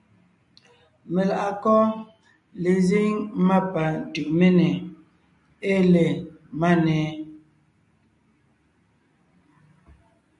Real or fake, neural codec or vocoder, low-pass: real; none; 10.8 kHz